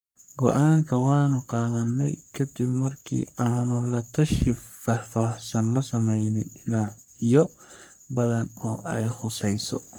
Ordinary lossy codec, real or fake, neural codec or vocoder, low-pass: none; fake; codec, 44.1 kHz, 3.4 kbps, Pupu-Codec; none